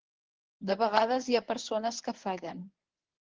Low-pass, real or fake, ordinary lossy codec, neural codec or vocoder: 7.2 kHz; fake; Opus, 16 kbps; codec, 24 kHz, 0.9 kbps, WavTokenizer, medium speech release version 1